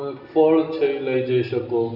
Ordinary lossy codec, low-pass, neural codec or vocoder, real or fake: none; 5.4 kHz; none; real